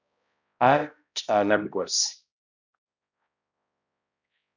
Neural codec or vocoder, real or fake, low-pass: codec, 16 kHz, 0.5 kbps, X-Codec, HuBERT features, trained on balanced general audio; fake; 7.2 kHz